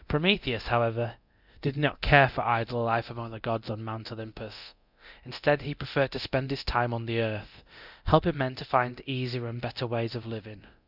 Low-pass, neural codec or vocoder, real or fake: 5.4 kHz; codec, 24 kHz, 0.9 kbps, DualCodec; fake